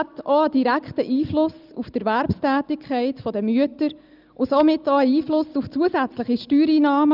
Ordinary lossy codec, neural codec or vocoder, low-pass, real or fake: Opus, 24 kbps; none; 5.4 kHz; real